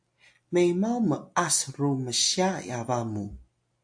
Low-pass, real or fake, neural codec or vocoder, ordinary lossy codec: 9.9 kHz; real; none; AAC, 64 kbps